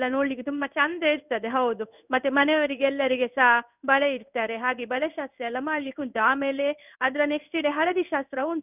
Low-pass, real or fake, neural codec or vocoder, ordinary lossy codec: 3.6 kHz; fake; codec, 16 kHz in and 24 kHz out, 1 kbps, XY-Tokenizer; none